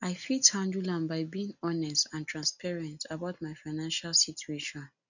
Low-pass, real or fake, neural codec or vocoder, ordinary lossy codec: 7.2 kHz; real; none; none